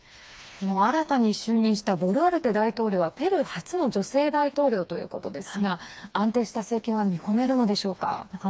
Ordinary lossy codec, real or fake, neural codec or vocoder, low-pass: none; fake; codec, 16 kHz, 2 kbps, FreqCodec, smaller model; none